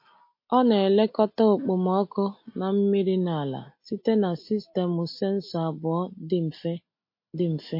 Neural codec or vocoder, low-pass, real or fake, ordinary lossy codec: none; 5.4 kHz; real; MP3, 32 kbps